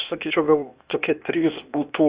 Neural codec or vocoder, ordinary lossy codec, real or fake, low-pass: codec, 16 kHz, 0.8 kbps, ZipCodec; Opus, 64 kbps; fake; 3.6 kHz